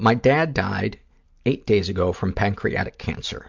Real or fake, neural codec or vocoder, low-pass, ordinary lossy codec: real; none; 7.2 kHz; MP3, 64 kbps